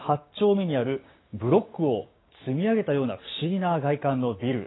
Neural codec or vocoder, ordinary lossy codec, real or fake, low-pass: codec, 16 kHz, 6 kbps, DAC; AAC, 16 kbps; fake; 7.2 kHz